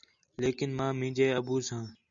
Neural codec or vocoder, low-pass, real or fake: none; 7.2 kHz; real